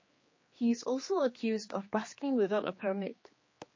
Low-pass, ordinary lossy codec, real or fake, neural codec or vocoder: 7.2 kHz; MP3, 32 kbps; fake; codec, 16 kHz, 2 kbps, X-Codec, HuBERT features, trained on general audio